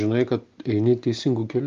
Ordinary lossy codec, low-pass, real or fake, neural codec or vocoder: Opus, 32 kbps; 7.2 kHz; real; none